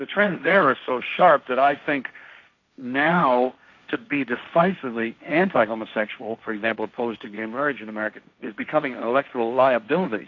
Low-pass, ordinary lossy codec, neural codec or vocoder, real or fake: 7.2 kHz; AAC, 48 kbps; codec, 16 kHz, 1.1 kbps, Voila-Tokenizer; fake